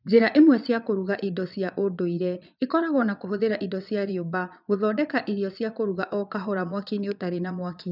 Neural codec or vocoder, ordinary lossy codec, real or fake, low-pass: vocoder, 22.05 kHz, 80 mel bands, Vocos; none; fake; 5.4 kHz